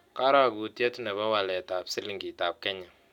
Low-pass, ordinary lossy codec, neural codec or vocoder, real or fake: 19.8 kHz; none; none; real